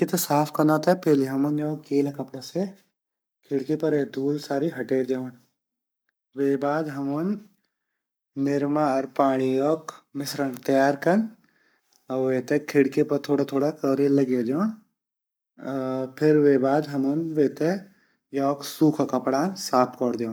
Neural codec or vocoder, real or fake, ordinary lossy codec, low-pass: codec, 44.1 kHz, 7.8 kbps, Pupu-Codec; fake; none; none